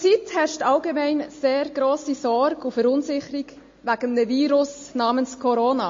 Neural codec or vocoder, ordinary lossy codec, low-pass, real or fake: none; MP3, 32 kbps; 7.2 kHz; real